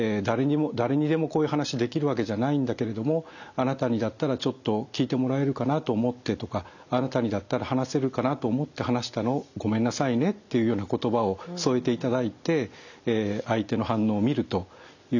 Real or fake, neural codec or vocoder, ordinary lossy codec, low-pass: real; none; none; 7.2 kHz